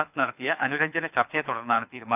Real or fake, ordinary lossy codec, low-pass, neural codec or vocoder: fake; none; 3.6 kHz; codec, 24 kHz, 6 kbps, HILCodec